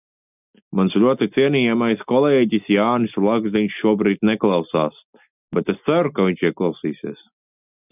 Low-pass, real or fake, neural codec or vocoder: 3.6 kHz; real; none